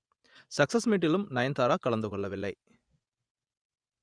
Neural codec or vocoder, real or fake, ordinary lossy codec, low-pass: vocoder, 24 kHz, 100 mel bands, Vocos; fake; Opus, 64 kbps; 9.9 kHz